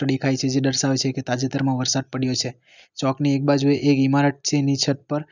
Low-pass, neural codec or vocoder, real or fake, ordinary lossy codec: 7.2 kHz; none; real; none